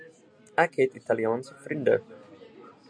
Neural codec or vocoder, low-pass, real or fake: none; 9.9 kHz; real